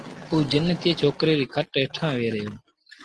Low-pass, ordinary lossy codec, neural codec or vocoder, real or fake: 10.8 kHz; Opus, 16 kbps; none; real